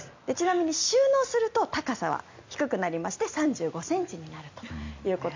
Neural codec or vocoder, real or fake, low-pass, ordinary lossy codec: none; real; 7.2 kHz; MP3, 64 kbps